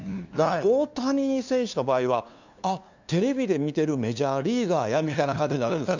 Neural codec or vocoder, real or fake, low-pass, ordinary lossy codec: codec, 16 kHz, 2 kbps, FunCodec, trained on LibriTTS, 25 frames a second; fake; 7.2 kHz; none